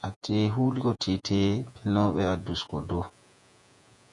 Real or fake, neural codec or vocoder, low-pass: fake; vocoder, 48 kHz, 128 mel bands, Vocos; 10.8 kHz